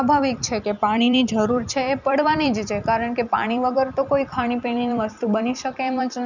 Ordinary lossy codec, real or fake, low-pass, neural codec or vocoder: none; fake; 7.2 kHz; vocoder, 44.1 kHz, 128 mel bands every 256 samples, BigVGAN v2